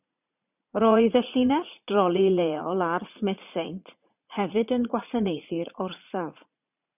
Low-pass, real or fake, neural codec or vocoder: 3.6 kHz; fake; vocoder, 44.1 kHz, 80 mel bands, Vocos